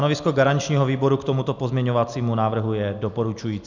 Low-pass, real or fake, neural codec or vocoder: 7.2 kHz; real; none